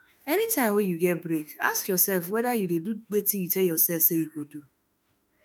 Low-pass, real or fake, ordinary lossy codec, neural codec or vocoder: none; fake; none; autoencoder, 48 kHz, 32 numbers a frame, DAC-VAE, trained on Japanese speech